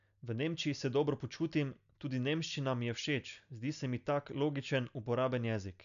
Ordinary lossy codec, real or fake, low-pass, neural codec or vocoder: none; real; 7.2 kHz; none